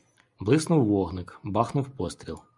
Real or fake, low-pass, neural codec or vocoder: real; 10.8 kHz; none